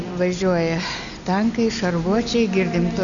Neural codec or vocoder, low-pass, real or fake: none; 7.2 kHz; real